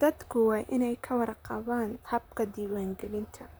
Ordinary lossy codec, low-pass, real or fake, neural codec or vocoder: none; none; fake; vocoder, 44.1 kHz, 128 mel bands, Pupu-Vocoder